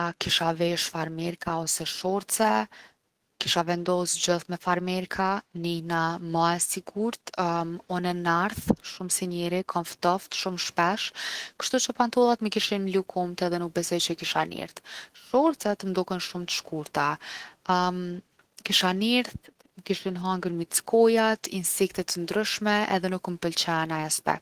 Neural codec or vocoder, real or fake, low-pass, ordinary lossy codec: autoencoder, 48 kHz, 128 numbers a frame, DAC-VAE, trained on Japanese speech; fake; 14.4 kHz; Opus, 16 kbps